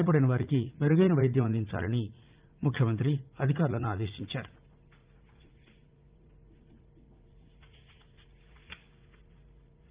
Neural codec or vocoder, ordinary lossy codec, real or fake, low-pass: vocoder, 44.1 kHz, 80 mel bands, Vocos; Opus, 32 kbps; fake; 3.6 kHz